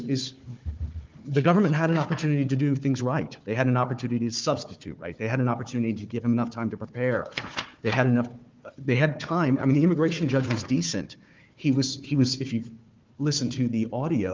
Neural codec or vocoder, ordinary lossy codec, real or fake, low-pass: codec, 16 kHz, 4 kbps, FunCodec, trained on Chinese and English, 50 frames a second; Opus, 32 kbps; fake; 7.2 kHz